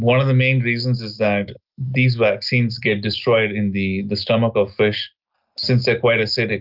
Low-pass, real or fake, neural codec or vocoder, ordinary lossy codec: 5.4 kHz; real; none; Opus, 24 kbps